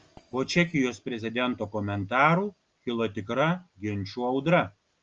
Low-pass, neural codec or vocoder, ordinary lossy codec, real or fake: 7.2 kHz; none; Opus, 24 kbps; real